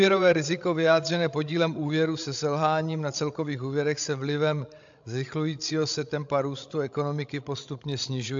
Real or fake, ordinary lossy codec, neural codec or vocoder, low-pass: fake; AAC, 64 kbps; codec, 16 kHz, 16 kbps, FreqCodec, larger model; 7.2 kHz